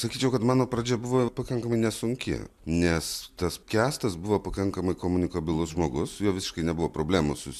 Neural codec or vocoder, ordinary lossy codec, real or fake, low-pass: none; MP3, 96 kbps; real; 14.4 kHz